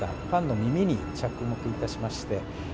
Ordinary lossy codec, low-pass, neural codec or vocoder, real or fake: none; none; none; real